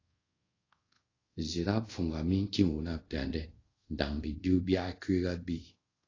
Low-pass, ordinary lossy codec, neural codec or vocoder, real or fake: 7.2 kHz; AAC, 32 kbps; codec, 24 kHz, 0.5 kbps, DualCodec; fake